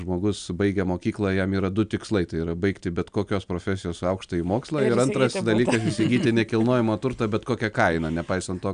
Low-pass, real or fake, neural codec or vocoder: 9.9 kHz; real; none